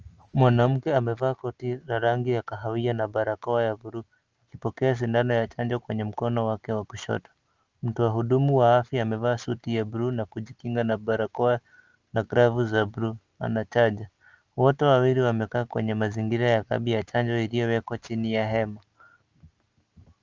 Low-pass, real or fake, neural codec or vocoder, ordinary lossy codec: 7.2 kHz; real; none; Opus, 24 kbps